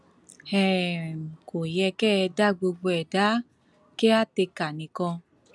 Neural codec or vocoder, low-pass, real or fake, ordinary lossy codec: none; none; real; none